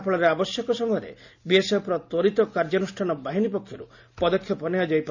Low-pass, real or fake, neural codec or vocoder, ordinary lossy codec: 7.2 kHz; real; none; none